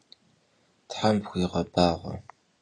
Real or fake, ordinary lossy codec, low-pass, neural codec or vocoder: real; AAC, 32 kbps; 9.9 kHz; none